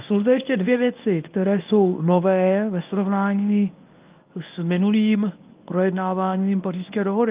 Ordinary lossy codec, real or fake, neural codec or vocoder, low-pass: Opus, 24 kbps; fake; codec, 24 kHz, 0.9 kbps, WavTokenizer, medium speech release version 1; 3.6 kHz